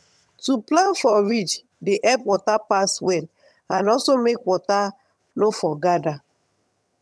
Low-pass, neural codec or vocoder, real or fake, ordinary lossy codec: none; vocoder, 22.05 kHz, 80 mel bands, HiFi-GAN; fake; none